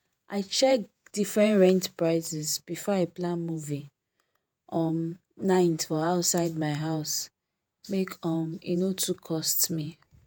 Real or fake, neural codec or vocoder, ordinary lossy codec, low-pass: fake; vocoder, 48 kHz, 128 mel bands, Vocos; none; none